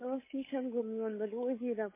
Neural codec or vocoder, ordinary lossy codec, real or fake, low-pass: codec, 16 kHz, 8 kbps, FunCodec, trained on Chinese and English, 25 frames a second; MP3, 16 kbps; fake; 3.6 kHz